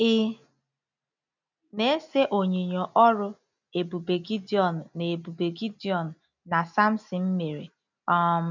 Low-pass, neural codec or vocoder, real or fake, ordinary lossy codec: 7.2 kHz; none; real; none